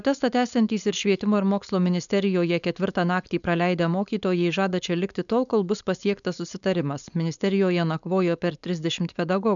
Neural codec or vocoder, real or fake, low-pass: codec, 16 kHz, 4.8 kbps, FACodec; fake; 7.2 kHz